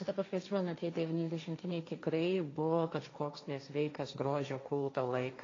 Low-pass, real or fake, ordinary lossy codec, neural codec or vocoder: 7.2 kHz; fake; AAC, 32 kbps; codec, 16 kHz, 1.1 kbps, Voila-Tokenizer